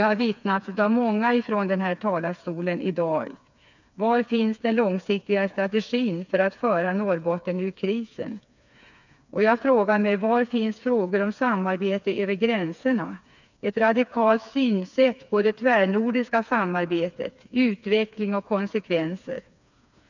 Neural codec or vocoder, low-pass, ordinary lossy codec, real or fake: codec, 16 kHz, 4 kbps, FreqCodec, smaller model; 7.2 kHz; none; fake